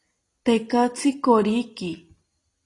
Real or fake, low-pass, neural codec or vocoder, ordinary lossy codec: real; 10.8 kHz; none; AAC, 32 kbps